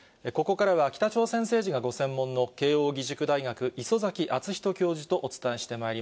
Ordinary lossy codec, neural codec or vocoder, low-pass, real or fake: none; none; none; real